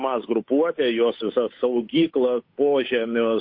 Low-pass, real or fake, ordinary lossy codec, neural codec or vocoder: 5.4 kHz; fake; MP3, 32 kbps; codec, 16 kHz, 8 kbps, FunCodec, trained on Chinese and English, 25 frames a second